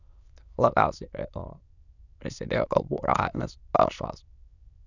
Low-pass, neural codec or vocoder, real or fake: 7.2 kHz; autoencoder, 22.05 kHz, a latent of 192 numbers a frame, VITS, trained on many speakers; fake